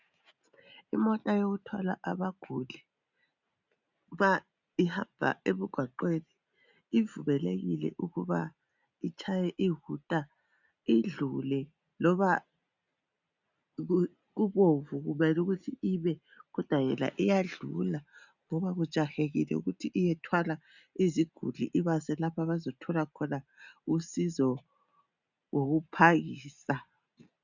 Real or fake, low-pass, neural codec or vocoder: real; 7.2 kHz; none